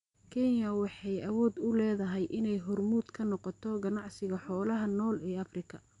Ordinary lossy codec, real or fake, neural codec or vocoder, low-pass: none; real; none; 10.8 kHz